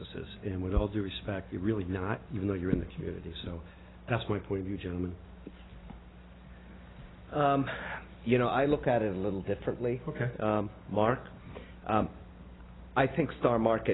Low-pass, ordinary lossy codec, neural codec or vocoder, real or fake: 7.2 kHz; AAC, 16 kbps; none; real